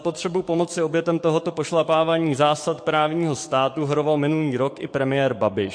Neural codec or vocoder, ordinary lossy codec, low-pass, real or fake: codec, 44.1 kHz, 7.8 kbps, DAC; MP3, 48 kbps; 9.9 kHz; fake